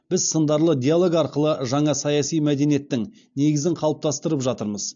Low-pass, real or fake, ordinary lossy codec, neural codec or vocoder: 7.2 kHz; real; AAC, 64 kbps; none